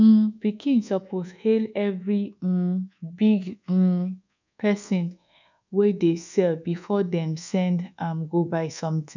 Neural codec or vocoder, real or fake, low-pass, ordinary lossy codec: codec, 24 kHz, 1.2 kbps, DualCodec; fake; 7.2 kHz; none